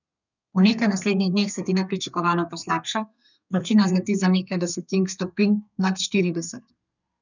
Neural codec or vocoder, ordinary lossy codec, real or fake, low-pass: codec, 32 kHz, 1.9 kbps, SNAC; none; fake; 7.2 kHz